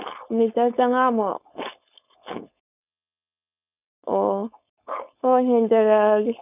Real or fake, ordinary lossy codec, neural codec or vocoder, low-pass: fake; none; codec, 16 kHz, 4.8 kbps, FACodec; 3.6 kHz